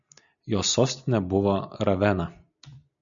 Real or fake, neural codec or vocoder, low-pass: real; none; 7.2 kHz